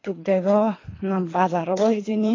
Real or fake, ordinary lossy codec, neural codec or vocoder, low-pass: fake; AAC, 32 kbps; codec, 24 kHz, 3 kbps, HILCodec; 7.2 kHz